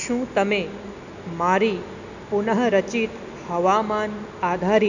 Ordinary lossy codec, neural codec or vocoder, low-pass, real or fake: none; none; 7.2 kHz; real